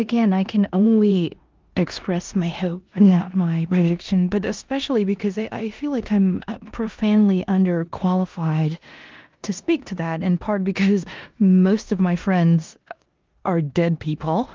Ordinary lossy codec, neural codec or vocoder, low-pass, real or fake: Opus, 32 kbps; codec, 16 kHz in and 24 kHz out, 0.9 kbps, LongCat-Audio-Codec, four codebook decoder; 7.2 kHz; fake